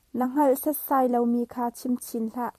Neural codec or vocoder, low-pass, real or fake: vocoder, 44.1 kHz, 128 mel bands every 256 samples, BigVGAN v2; 14.4 kHz; fake